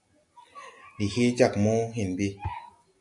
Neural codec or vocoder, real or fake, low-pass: none; real; 10.8 kHz